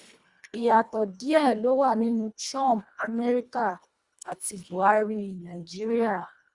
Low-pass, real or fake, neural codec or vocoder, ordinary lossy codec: 10.8 kHz; fake; codec, 24 kHz, 1.5 kbps, HILCodec; Opus, 64 kbps